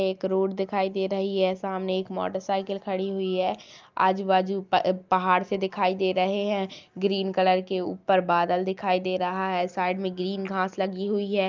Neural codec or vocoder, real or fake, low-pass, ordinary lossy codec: none; real; 7.2 kHz; Opus, 24 kbps